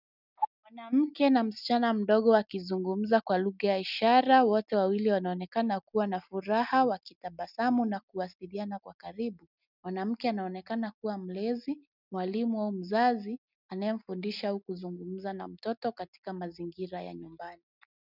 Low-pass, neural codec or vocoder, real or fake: 5.4 kHz; none; real